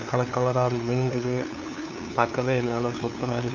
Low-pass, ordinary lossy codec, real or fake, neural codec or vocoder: none; none; fake; codec, 16 kHz, 2 kbps, FunCodec, trained on LibriTTS, 25 frames a second